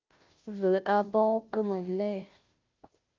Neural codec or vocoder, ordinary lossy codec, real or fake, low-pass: codec, 16 kHz, 0.5 kbps, FunCodec, trained on Chinese and English, 25 frames a second; Opus, 24 kbps; fake; 7.2 kHz